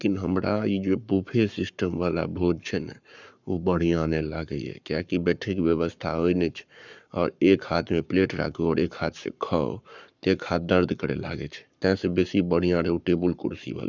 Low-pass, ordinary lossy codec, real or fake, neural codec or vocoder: 7.2 kHz; none; fake; codec, 44.1 kHz, 7.8 kbps, Pupu-Codec